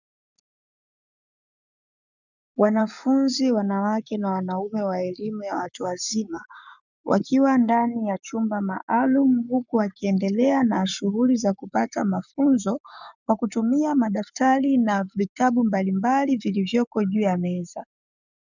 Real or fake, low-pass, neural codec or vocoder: fake; 7.2 kHz; codec, 44.1 kHz, 7.8 kbps, Pupu-Codec